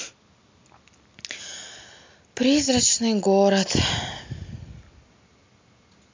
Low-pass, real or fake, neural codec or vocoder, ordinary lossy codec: 7.2 kHz; real; none; MP3, 64 kbps